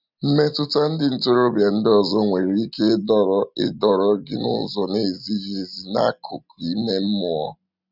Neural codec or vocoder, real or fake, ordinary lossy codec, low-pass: none; real; none; 5.4 kHz